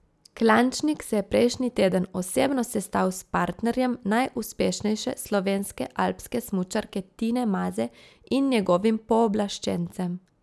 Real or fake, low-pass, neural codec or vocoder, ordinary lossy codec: real; none; none; none